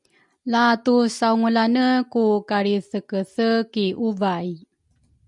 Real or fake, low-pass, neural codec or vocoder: real; 10.8 kHz; none